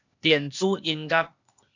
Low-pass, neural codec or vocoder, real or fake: 7.2 kHz; codec, 16 kHz, 0.8 kbps, ZipCodec; fake